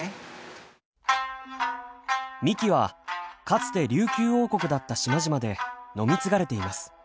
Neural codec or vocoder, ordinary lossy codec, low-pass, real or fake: none; none; none; real